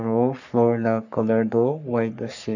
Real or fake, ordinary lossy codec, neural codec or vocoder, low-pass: fake; none; codec, 44.1 kHz, 3.4 kbps, Pupu-Codec; 7.2 kHz